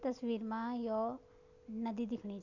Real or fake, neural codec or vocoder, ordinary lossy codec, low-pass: real; none; none; 7.2 kHz